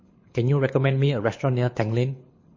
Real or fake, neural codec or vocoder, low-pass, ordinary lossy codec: fake; codec, 24 kHz, 6 kbps, HILCodec; 7.2 kHz; MP3, 32 kbps